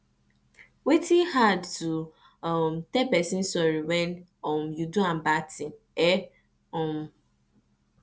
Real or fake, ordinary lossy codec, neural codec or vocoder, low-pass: real; none; none; none